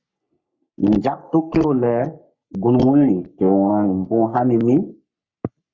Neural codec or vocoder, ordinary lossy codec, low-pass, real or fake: codec, 44.1 kHz, 3.4 kbps, Pupu-Codec; Opus, 64 kbps; 7.2 kHz; fake